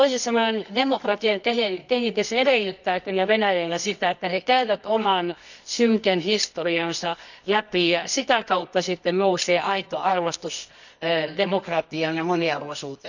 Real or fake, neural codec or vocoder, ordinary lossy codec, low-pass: fake; codec, 24 kHz, 0.9 kbps, WavTokenizer, medium music audio release; none; 7.2 kHz